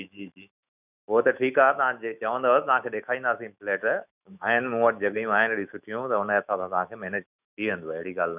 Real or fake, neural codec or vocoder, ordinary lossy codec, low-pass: fake; autoencoder, 48 kHz, 128 numbers a frame, DAC-VAE, trained on Japanese speech; none; 3.6 kHz